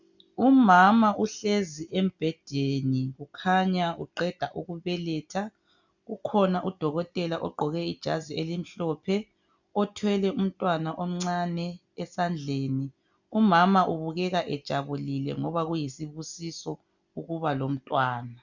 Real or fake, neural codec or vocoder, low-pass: real; none; 7.2 kHz